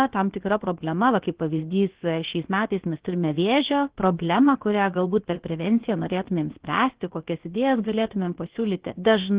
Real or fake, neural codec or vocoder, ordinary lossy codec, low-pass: fake; codec, 16 kHz, about 1 kbps, DyCAST, with the encoder's durations; Opus, 16 kbps; 3.6 kHz